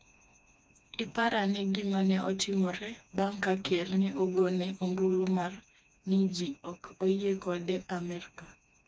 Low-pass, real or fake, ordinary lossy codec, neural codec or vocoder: none; fake; none; codec, 16 kHz, 2 kbps, FreqCodec, smaller model